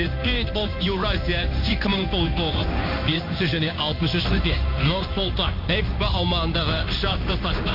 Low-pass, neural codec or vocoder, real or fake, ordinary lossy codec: 5.4 kHz; codec, 16 kHz in and 24 kHz out, 1 kbps, XY-Tokenizer; fake; none